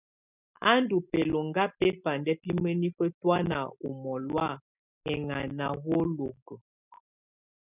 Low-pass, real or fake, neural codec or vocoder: 3.6 kHz; real; none